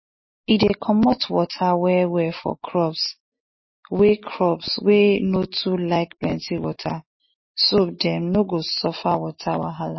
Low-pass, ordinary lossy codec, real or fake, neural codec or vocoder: 7.2 kHz; MP3, 24 kbps; real; none